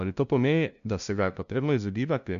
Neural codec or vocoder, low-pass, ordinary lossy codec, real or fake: codec, 16 kHz, 0.5 kbps, FunCodec, trained on LibriTTS, 25 frames a second; 7.2 kHz; none; fake